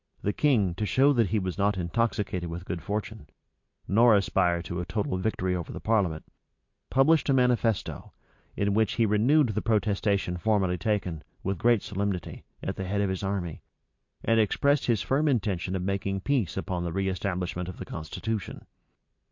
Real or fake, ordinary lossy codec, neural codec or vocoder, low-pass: real; MP3, 48 kbps; none; 7.2 kHz